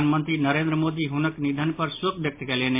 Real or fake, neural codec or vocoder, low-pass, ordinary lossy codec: real; none; 3.6 kHz; MP3, 24 kbps